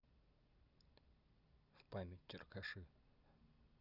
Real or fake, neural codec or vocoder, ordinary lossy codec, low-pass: real; none; none; 5.4 kHz